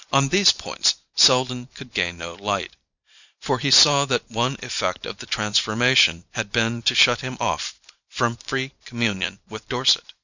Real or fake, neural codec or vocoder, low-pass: fake; vocoder, 44.1 kHz, 128 mel bands every 512 samples, BigVGAN v2; 7.2 kHz